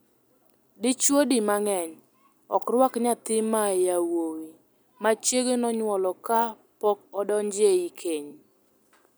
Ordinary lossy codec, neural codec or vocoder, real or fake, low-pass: none; none; real; none